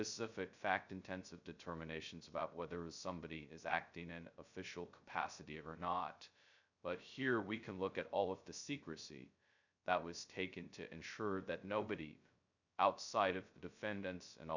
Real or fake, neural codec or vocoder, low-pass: fake; codec, 16 kHz, 0.2 kbps, FocalCodec; 7.2 kHz